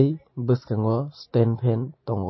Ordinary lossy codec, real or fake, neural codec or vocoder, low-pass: MP3, 24 kbps; real; none; 7.2 kHz